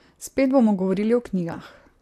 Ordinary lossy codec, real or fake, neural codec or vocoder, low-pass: AAC, 64 kbps; fake; vocoder, 44.1 kHz, 128 mel bands, Pupu-Vocoder; 14.4 kHz